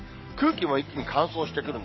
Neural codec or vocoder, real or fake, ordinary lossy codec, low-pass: codec, 44.1 kHz, 7.8 kbps, DAC; fake; MP3, 24 kbps; 7.2 kHz